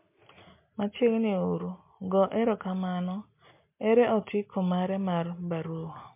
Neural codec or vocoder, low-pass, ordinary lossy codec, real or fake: none; 3.6 kHz; MP3, 24 kbps; real